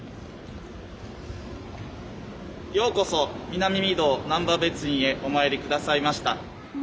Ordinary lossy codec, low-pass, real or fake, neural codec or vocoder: none; none; real; none